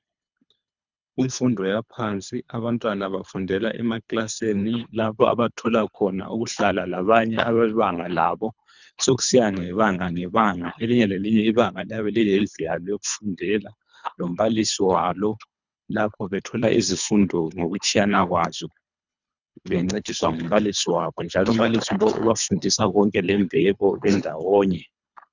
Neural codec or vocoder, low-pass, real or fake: codec, 24 kHz, 3 kbps, HILCodec; 7.2 kHz; fake